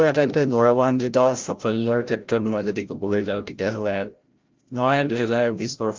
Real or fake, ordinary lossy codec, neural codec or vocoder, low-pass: fake; Opus, 32 kbps; codec, 16 kHz, 0.5 kbps, FreqCodec, larger model; 7.2 kHz